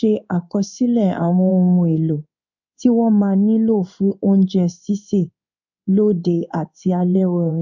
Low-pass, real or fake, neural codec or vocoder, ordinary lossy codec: 7.2 kHz; fake; codec, 16 kHz in and 24 kHz out, 1 kbps, XY-Tokenizer; none